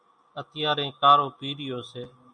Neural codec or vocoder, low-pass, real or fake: none; 9.9 kHz; real